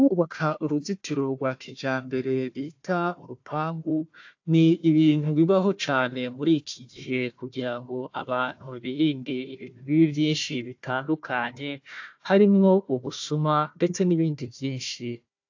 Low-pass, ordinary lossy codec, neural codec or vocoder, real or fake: 7.2 kHz; AAC, 48 kbps; codec, 16 kHz, 1 kbps, FunCodec, trained on Chinese and English, 50 frames a second; fake